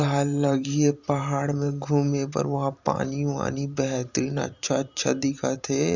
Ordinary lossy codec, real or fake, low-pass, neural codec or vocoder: Opus, 64 kbps; fake; 7.2 kHz; vocoder, 44.1 kHz, 128 mel bands every 512 samples, BigVGAN v2